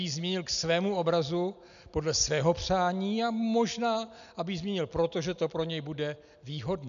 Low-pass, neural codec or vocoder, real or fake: 7.2 kHz; none; real